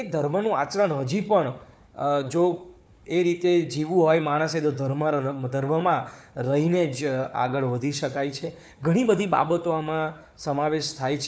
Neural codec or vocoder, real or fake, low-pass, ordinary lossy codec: codec, 16 kHz, 4 kbps, FunCodec, trained on Chinese and English, 50 frames a second; fake; none; none